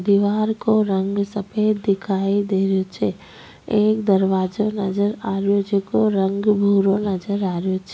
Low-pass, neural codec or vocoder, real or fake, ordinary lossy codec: none; none; real; none